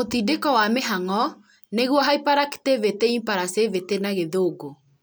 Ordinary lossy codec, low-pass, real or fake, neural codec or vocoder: none; none; real; none